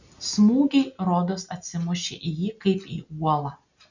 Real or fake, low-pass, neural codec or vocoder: real; 7.2 kHz; none